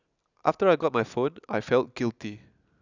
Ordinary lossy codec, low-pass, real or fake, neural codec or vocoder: none; 7.2 kHz; real; none